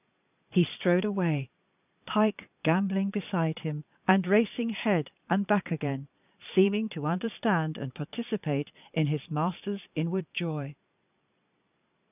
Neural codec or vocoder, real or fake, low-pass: vocoder, 22.05 kHz, 80 mel bands, Vocos; fake; 3.6 kHz